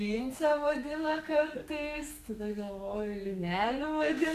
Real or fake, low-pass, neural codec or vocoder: fake; 14.4 kHz; codec, 32 kHz, 1.9 kbps, SNAC